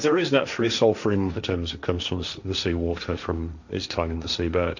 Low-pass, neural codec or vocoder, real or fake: 7.2 kHz; codec, 16 kHz, 1.1 kbps, Voila-Tokenizer; fake